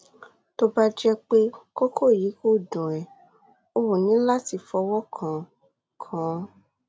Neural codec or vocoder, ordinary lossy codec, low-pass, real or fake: none; none; none; real